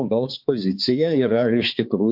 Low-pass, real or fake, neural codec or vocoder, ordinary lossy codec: 5.4 kHz; fake; codec, 16 kHz, 4 kbps, X-Codec, HuBERT features, trained on balanced general audio; MP3, 48 kbps